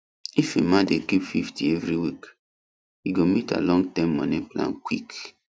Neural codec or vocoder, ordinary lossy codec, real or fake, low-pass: none; none; real; none